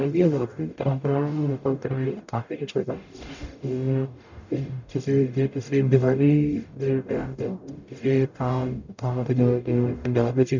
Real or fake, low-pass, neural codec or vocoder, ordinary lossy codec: fake; 7.2 kHz; codec, 44.1 kHz, 0.9 kbps, DAC; none